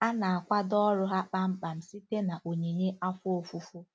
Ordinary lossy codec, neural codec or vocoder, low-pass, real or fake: none; none; none; real